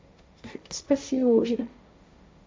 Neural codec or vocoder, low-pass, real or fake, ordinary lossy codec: codec, 16 kHz, 1.1 kbps, Voila-Tokenizer; none; fake; none